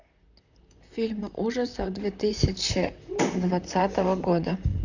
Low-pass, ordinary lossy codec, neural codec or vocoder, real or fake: 7.2 kHz; none; vocoder, 44.1 kHz, 128 mel bands, Pupu-Vocoder; fake